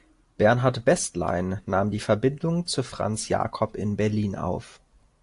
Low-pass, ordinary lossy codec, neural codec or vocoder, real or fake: 10.8 kHz; AAC, 64 kbps; none; real